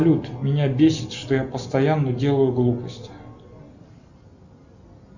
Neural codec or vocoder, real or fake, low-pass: none; real; 7.2 kHz